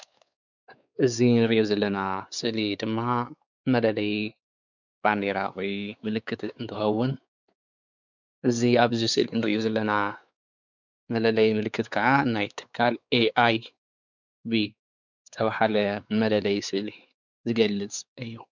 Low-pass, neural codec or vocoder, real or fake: 7.2 kHz; codec, 16 kHz, 4 kbps, X-Codec, WavLM features, trained on Multilingual LibriSpeech; fake